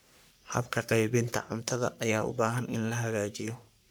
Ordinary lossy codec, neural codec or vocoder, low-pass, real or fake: none; codec, 44.1 kHz, 3.4 kbps, Pupu-Codec; none; fake